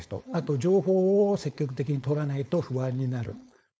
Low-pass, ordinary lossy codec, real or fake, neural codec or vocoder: none; none; fake; codec, 16 kHz, 4.8 kbps, FACodec